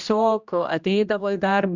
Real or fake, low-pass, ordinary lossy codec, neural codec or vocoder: fake; 7.2 kHz; Opus, 64 kbps; codec, 16 kHz, 1 kbps, X-Codec, HuBERT features, trained on general audio